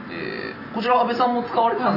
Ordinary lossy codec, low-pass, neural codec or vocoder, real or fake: none; 5.4 kHz; none; real